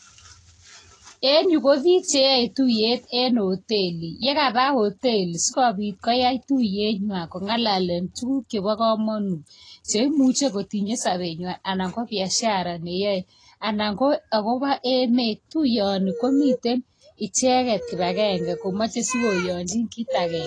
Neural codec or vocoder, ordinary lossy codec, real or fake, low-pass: none; AAC, 32 kbps; real; 9.9 kHz